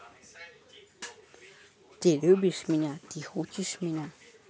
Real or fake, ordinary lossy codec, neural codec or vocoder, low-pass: real; none; none; none